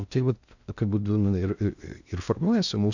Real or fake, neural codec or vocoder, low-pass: fake; codec, 16 kHz in and 24 kHz out, 0.6 kbps, FocalCodec, streaming, 2048 codes; 7.2 kHz